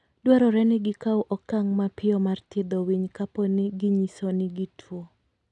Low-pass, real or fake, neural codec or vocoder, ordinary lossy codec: 10.8 kHz; real; none; none